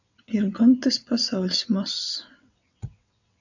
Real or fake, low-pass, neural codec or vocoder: fake; 7.2 kHz; vocoder, 22.05 kHz, 80 mel bands, WaveNeXt